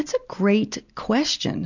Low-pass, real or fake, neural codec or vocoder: 7.2 kHz; real; none